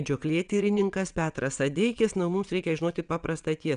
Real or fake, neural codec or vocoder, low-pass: fake; vocoder, 22.05 kHz, 80 mel bands, WaveNeXt; 9.9 kHz